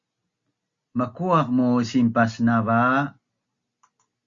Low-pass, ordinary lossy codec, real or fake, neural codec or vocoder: 7.2 kHz; Opus, 64 kbps; real; none